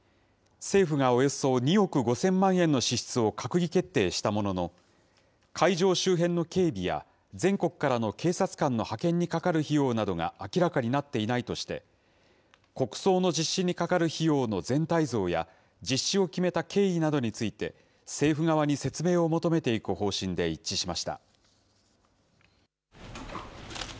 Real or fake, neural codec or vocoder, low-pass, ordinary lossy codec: real; none; none; none